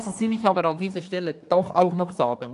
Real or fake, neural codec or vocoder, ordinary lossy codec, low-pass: fake; codec, 24 kHz, 1 kbps, SNAC; AAC, 64 kbps; 10.8 kHz